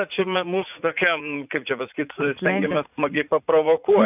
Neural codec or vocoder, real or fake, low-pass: vocoder, 44.1 kHz, 80 mel bands, Vocos; fake; 3.6 kHz